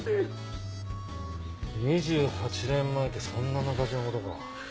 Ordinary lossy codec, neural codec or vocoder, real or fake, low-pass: none; none; real; none